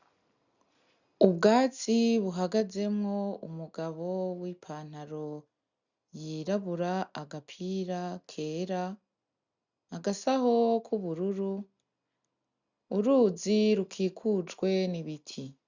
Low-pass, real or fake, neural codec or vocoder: 7.2 kHz; real; none